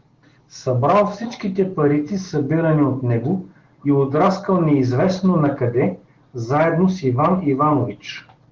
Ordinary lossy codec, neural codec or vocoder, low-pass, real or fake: Opus, 16 kbps; none; 7.2 kHz; real